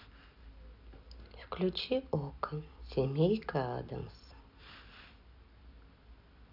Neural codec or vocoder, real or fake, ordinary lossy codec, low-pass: none; real; none; 5.4 kHz